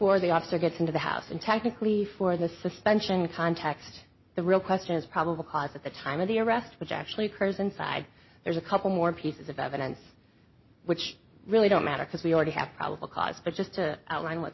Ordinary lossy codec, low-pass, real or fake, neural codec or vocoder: MP3, 24 kbps; 7.2 kHz; real; none